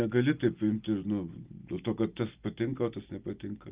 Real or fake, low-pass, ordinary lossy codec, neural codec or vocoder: real; 3.6 kHz; Opus, 32 kbps; none